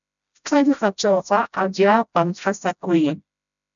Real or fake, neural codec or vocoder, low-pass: fake; codec, 16 kHz, 0.5 kbps, FreqCodec, smaller model; 7.2 kHz